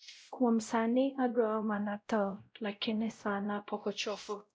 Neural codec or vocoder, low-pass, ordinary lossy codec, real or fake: codec, 16 kHz, 0.5 kbps, X-Codec, WavLM features, trained on Multilingual LibriSpeech; none; none; fake